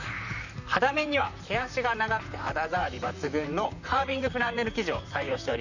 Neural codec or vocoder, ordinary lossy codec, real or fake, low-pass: vocoder, 44.1 kHz, 128 mel bands, Pupu-Vocoder; none; fake; 7.2 kHz